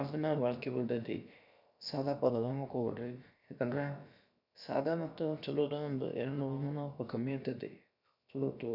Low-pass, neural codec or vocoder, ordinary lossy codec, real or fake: 5.4 kHz; codec, 16 kHz, about 1 kbps, DyCAST, with the encoder's durations; none; fake